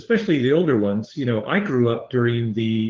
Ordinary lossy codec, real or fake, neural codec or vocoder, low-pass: Opus, 16 kbps; fake; codec, 16 kHz, 4 kbps, FunCodec, trained on LibriTTS, 50 frames a second; 7.2 kHz